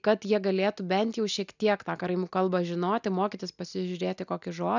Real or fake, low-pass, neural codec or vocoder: real; 7.2 kHz; none